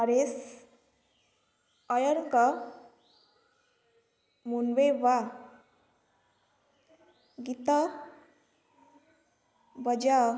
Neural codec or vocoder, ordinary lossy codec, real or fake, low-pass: none; none; real; none